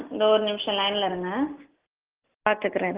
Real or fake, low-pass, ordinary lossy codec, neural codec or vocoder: real; 3.6 kHz; Opus, 16 kbps; none